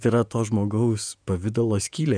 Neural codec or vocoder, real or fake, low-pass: vocoder, 48 kHz, 128 mel bands, Vocos; fake; 9.9 kHz